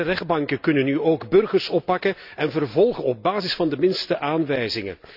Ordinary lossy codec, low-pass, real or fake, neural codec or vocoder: none; 5.4 kHz; real; none